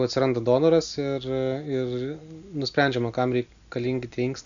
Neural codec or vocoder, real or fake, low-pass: none; real; 7.2 kHz